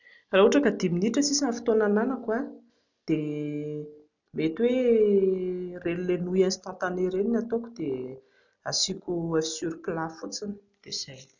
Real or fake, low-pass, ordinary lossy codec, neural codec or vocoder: real; 7.2 kHz; none; none